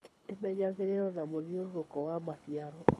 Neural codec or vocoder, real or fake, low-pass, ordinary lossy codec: codec, 24 kHz, 6 kbps, HILCodec; fake; none; none